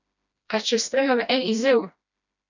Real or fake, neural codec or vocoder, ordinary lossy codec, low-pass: fake; codec, 16 kHz, 1 kbps, FreqCodec, smaller model; none; 7.2 kHz